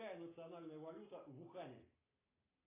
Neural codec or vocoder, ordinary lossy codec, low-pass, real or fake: none; AAC, 16 kbps; 3.6 kHz; real